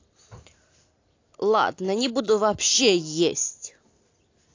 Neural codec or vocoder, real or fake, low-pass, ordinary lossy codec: none; real; 7.2 kHz; AAC, 48 kbps